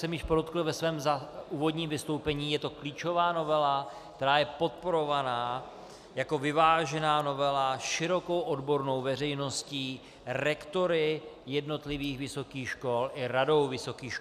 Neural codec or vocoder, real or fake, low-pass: none; real; 14.4 kHz